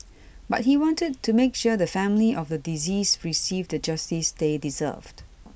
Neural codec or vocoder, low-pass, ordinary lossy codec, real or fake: none; none; none; real